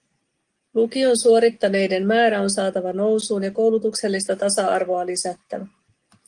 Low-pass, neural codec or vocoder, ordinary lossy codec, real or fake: 9.9 kHz; none; Opus, 24 kbps; real